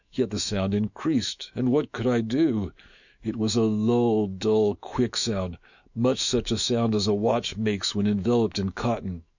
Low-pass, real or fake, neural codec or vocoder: 7.2 kHz; fake; autoencoder, 48 kHz, 128 numbers a frame, DAC-VAE, trained on Japanese speech